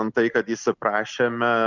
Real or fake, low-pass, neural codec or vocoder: real; 7.2 kHz; none